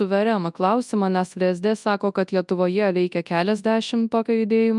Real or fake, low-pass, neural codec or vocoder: fake; 10.8 kHz; codec, 24 kHz, 0.9 kbps, WavTokenizer, large speech release